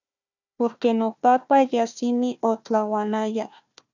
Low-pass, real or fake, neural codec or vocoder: 7.2 kHz; fake; codec, 16 kHz, 1 kbps, FunCodec, trained on Chinese and English, 50 frames a second